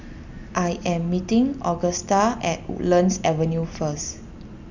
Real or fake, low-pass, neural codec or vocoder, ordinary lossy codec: real; 7.2 kHz; none; Opus, 64 kbps